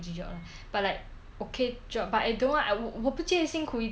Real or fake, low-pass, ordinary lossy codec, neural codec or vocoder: real; none; none; none